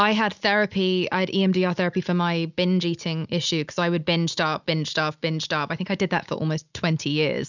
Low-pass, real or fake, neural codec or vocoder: 7.2 kHz; real; none